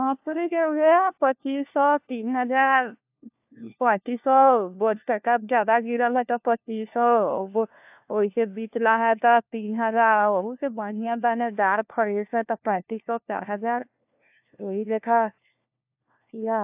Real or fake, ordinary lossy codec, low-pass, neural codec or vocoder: fake; AAC, 32 kbps; 3.6 kHz; codec, 16 kHz, 1 kbps, FunCodec, trained on LibriTTS, 50 frames a second